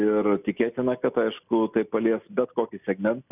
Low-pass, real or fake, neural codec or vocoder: 3.6 kHz; real; none